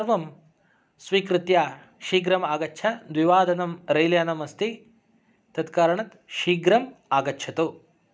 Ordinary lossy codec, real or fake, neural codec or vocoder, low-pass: none; real; none; none